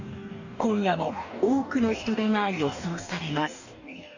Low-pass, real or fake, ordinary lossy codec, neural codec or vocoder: 7.2 kHz; fake; none; codec, 44.1 kHz, 2.6 kbps, DAC